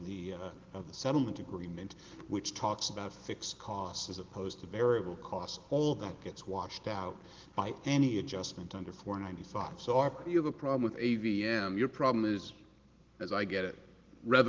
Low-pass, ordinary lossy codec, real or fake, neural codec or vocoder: 7.2 kHz; Opus, 16 kbps; real; none